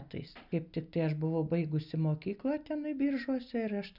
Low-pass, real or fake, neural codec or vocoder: 5.4 kHz; real; none